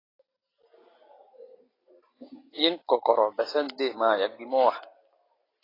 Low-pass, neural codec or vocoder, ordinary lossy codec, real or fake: 5.4 kHz; none; AAC, 24 kbps; real